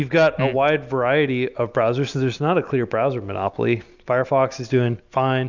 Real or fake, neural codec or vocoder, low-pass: real; none; 7.2 kHz